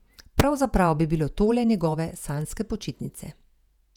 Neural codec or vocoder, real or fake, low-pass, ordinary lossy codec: vocoder, 48 kHz, 128 mel bands, Vocos; fake; 19.8 kHz; none